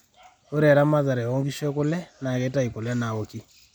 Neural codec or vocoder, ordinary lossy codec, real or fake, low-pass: none; none; real; 19.8 kHz